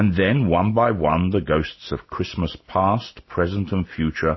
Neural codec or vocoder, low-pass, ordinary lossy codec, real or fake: none; 7.2 kHz; MP3, 24 kbps; real